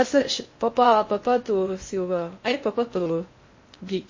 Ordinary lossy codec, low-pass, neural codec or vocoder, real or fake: MP3, 32 kbps; 7.2 kHz; codec, 16 kHz in and 24 kHz out, 0.6 kbps, FocalCodec, streaming, 4096 codes; fake